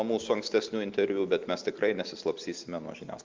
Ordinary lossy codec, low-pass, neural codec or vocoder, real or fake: Opus, 24 kbps; 7.2 kHz; none; real